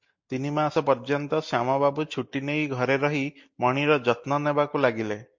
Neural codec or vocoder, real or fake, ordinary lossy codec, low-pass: none; real; MP3, 48 kbps; 7.2 kHz